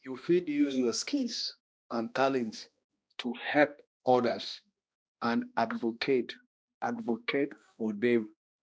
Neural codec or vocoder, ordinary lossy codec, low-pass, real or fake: codec, 16 kHz, 1 kbps, X-Codec, HuBERT features, trained on balanced general audio; none; none; fake